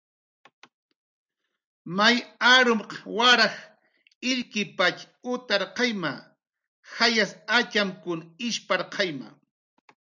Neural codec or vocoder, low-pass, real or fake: none; 7.2 kHz; real